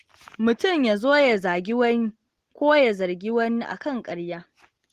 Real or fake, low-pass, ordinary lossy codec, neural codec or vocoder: real; 14.4 kHz; Opus, 16 kbps; none